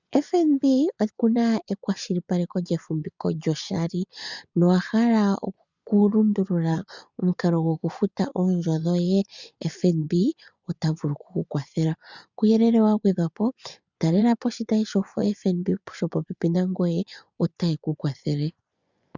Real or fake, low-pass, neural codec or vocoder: fake; 7.2 kHz; vocoder, 24 kHz, 100 mel bands, Vocos